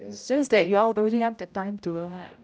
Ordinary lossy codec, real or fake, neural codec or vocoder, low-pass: none; fake; codec, 16 kHz, 0.5 kbps, X-Codec, HuBERT features, trained on general audio; none